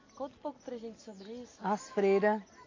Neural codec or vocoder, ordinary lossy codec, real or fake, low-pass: none; AAC, 32 kbps; real; 7.2 kHz